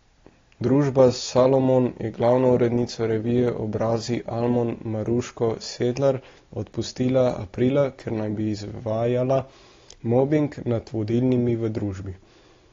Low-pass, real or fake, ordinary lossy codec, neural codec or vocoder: 7.2 kHz; real; AAC, 32 kbps; none